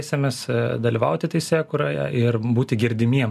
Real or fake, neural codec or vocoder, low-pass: real; none; 14.4 kHz